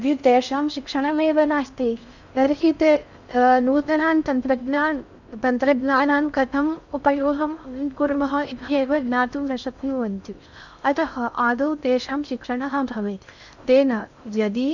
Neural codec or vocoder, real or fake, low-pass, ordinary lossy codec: codec, 16 kHz in and 24 kHz out, 0.6 kbps, FocalCodec, streaming, 4096 codes; fake; 7.2 kHz; none